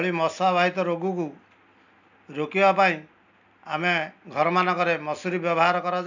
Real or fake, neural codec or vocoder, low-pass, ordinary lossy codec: real; none; 7.2 kHz; none